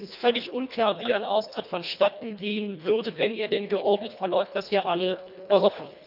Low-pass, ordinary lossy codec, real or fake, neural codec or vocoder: 5.4 kHz; none; fake; codec, 24 kHz, 1.5 kbps, HILCodec